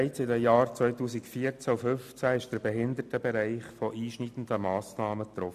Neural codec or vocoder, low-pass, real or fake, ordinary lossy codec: none; 14.4 kHz; real; MP3, 96 kbps